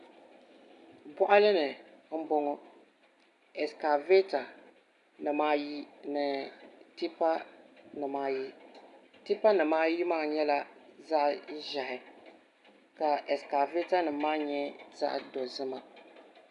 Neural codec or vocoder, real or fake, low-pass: none; real; 10.8 kHz